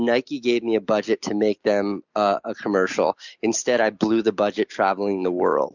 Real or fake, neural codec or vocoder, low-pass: real; none; 7.2 kHz